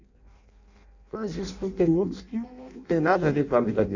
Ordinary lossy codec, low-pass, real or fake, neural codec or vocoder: none; 7.2 kHz; fake; codec, 16 kHz in and 24 kHz out, 0.6 kbps, FireRedTTS-2 codec